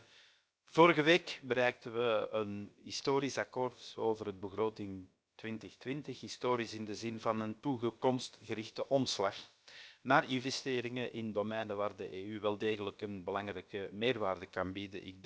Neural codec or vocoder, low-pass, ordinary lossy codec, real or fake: codec, 16 kHz, about 1 kbps, DyCAST, with the encoder's durations; none; none; fake